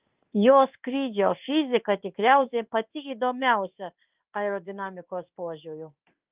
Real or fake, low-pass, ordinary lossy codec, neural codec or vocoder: fake; 3.6 kHz; Opus, 24 kbps; codec, 16 kHz in and 24 kHz out, 1 kbps, XY-Tokenizer